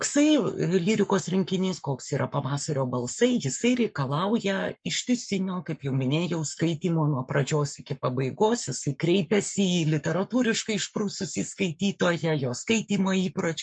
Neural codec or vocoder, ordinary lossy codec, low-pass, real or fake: codec, 44.1 kHz, 7.8 kbps, Pupu-Codec; MP3, 64 kbps; 9.9 kHz; fake